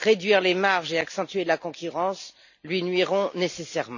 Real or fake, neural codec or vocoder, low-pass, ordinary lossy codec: real; none; 7.2 kHz; none